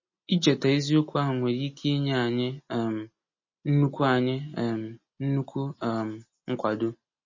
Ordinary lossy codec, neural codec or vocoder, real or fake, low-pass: MP3, 32 kbps; none; real; 7.2 kHz